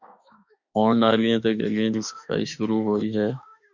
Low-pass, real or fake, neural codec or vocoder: 7.2 kHz; fake; autoencoder, 48 kHz, 32 numbers a frame, DAC-VAE, trained on Japanese speech